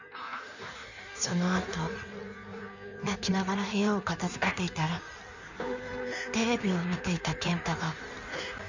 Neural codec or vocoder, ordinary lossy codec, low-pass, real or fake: codec, 16 kHz in and 24 kHz out, 1.1 kbps, FireRedTTS-2 codec; none; 7.2 kHz; fake